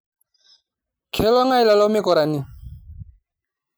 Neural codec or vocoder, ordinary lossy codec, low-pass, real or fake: none; none; none; real